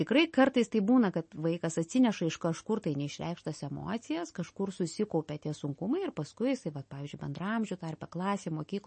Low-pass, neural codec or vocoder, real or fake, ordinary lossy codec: 10.8 kHz; none; real; MP3, 32 kbps